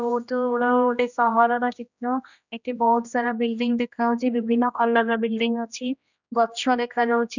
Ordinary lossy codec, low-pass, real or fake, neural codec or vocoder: none; 7.2 kHz; fake; codec, 16 kHz, 1 kbps, X-Codec, HuBERT features, trained on general audio